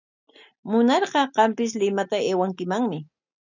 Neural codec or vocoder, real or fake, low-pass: none; real; 7.2 kHz